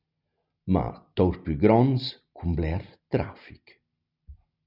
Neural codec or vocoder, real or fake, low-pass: none; real; 5.4 kHz